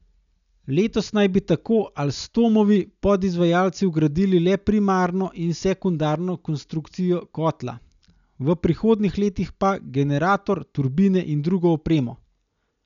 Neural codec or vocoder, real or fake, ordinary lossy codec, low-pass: none; real; none; 7.2 kHz